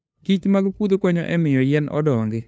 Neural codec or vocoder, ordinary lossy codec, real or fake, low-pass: codec, 16 kHz, 2 kbps, FunCodec, trained on LibriTTS, 25 frames a second; none; fake; none